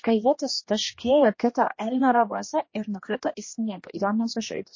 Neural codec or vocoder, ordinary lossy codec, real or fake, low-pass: codec, 16 kHz, 1 kbps, X-Codec, HuBERT features, trained on general audio; MP3, 32 kbps; fake; 7.2 kHz